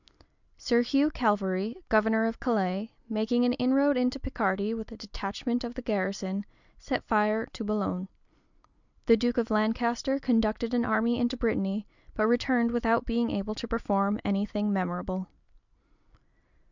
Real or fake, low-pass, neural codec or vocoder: real; 7.2 kHz; none